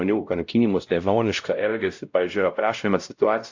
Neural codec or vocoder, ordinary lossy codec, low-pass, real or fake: codec, 16 kHz, 0.5 kbps, X-Codec, WavLM features, trained on Multilingual LibriSpeech; AAC, 48 kbps; 7.2 kHz; fake